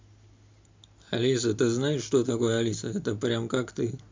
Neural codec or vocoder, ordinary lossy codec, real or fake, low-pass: none; MP3, 64 kbps; real; 7.2 kHz